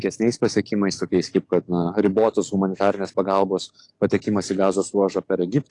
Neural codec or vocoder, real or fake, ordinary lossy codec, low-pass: codec, 44.1 kHz, 7.8 kbps, DAC; fake; AAC, 48 kbps; 10.8 kHz